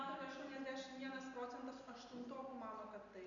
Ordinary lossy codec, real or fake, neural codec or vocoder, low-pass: MP3, 96 kbps; real; none; 7.2 kHz